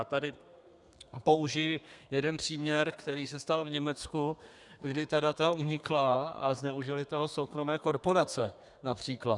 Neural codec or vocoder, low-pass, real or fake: codec, 32 kHz, 1.9 kbps, SNAC; 10.8 kHz; fake